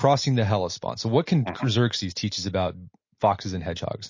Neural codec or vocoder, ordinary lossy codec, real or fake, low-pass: none; MP3, 32 kbps; real; 7.2 kHz